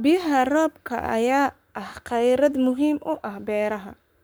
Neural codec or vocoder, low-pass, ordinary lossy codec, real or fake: codec, 44.1 kHz, 7.8 kbps, Pupu-Codec; none; none; fake